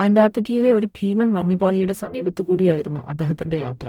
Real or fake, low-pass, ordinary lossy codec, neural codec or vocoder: fake; 19.8 kHz; none; codec, 44.1 kHz, 0.9 kbps, DAC